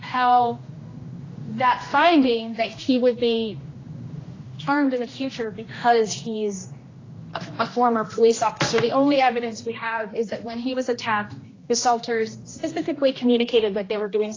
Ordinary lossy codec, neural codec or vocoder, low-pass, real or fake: AAC, 32 kbps; codec, 16 kHz, 1 kbps, X-Codec, HuBERT features, trained on general audio; 7.2 kHz; fake